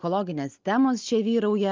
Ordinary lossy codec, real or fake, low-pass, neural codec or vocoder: Opus, 32 kbps; real; 7.2 kHz; none